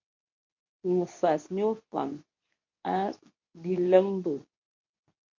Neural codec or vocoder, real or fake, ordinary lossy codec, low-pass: codec, 24 kHz, 0.9 kbps, WavTokenizer, medium speech release version 2; fake; MP3, 48 kbps; 7.2 kHz